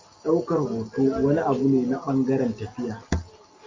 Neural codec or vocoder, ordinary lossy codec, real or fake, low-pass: none; MP3, 48 kbps; real; 7.2 kHz